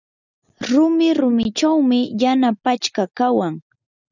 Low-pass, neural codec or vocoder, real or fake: 7.2 kHz; none; real